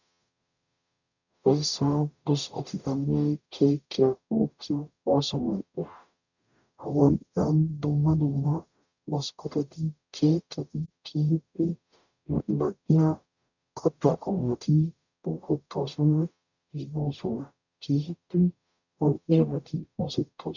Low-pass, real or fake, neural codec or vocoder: 7.2 kHz; fake; codec, 44.1 kHz, 0.9 kbps, DAC